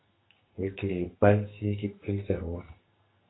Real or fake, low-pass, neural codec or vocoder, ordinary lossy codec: fake; 7.2 kHz; codec, 44.1 kHz, 2.6 kbps, SNAC; AAC, 16 kbps